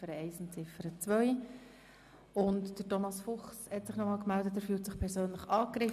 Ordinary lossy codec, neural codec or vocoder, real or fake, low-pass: MP3, 96 kbps; none; real; 14.4 kHz